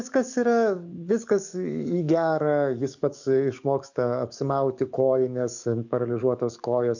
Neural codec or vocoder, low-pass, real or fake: codec, 44.1 kHz, 7.8 kbps, DAC; 7.2 kHz; fake